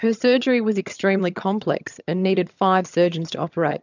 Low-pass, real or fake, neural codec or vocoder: 7.2 kHz; fake; vocoder, 22.05 kHz, 80 mel bands, HiFi-GAN